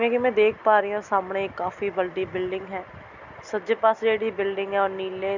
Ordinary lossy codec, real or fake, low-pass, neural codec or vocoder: none; real; 7.2 kHz; none